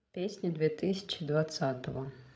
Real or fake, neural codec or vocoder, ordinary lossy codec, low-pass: fake; codec, 16 kHz, 16 kbps, FreqCodec, larger model; none; none